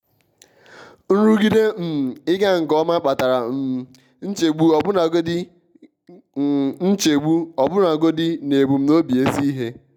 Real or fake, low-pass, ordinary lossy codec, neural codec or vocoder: real; 19.8 kHz; none; none